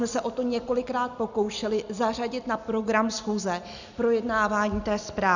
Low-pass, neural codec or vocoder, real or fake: 7.2 kHz; none; real